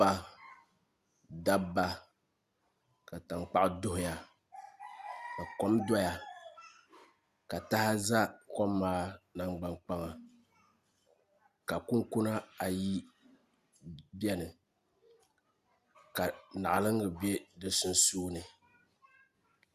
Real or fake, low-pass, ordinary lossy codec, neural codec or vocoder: real; 14.4 kHz; Opus, 64 kbps; none